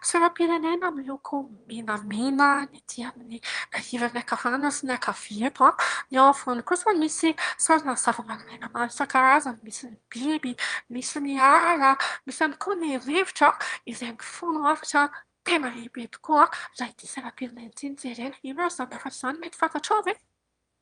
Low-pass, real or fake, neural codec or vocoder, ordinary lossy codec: 9.9 kHz; fake; autoencoder, 22.05 kHz, a latent of 192 numbers a frame, VITS, trained on one speaker; Opus, 32 kbps